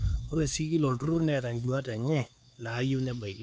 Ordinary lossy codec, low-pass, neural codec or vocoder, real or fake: none; none; codec, 16 kHz, 4 kbps, X-Codec, HuBERT features, trained on LibriSpeech; fake